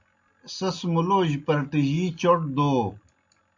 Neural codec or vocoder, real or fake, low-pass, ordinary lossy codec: none; real; 7.2 kHz; MP3, 64 kbps